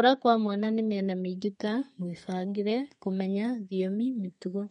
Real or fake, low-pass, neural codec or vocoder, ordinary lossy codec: fake; 14.4 kHz; codec, 32 kHz, 1.9 kbps, SNAC; MP3, 48 kbps